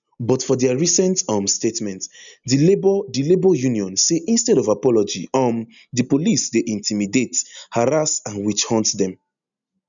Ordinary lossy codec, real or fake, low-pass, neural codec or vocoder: none; real; 7.2 kHz; none